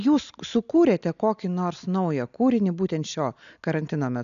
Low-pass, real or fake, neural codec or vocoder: 7.2 kHz; real; none